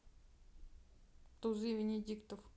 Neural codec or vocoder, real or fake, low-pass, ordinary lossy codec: none; real; none; none